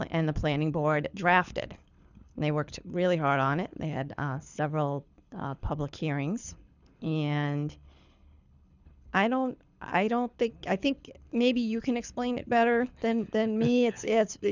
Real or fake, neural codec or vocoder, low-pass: fake; codec, 24 kHz, 6 kbps, HILCodec; 7.2 kHz